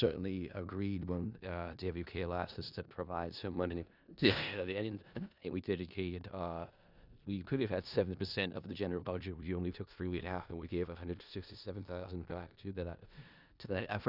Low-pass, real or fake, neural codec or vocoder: 5.4 kHz; fake; codec, 16 kHz in and 24 kHz out, 0.4 kbps, LongCat-Audio-Codec, four codebook decoder